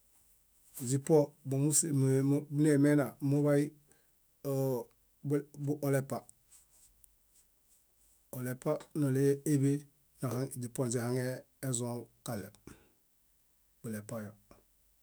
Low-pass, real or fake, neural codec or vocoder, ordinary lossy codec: none; fake; autoencoder, 48 kHz, 128 numbers a frame, DAC-VAE, trained on Japanese speech; none